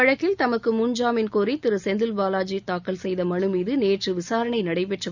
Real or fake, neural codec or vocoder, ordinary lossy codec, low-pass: real; none; none; 7.2 kHz